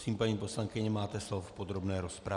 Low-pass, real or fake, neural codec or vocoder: 10.8 kHz; real; none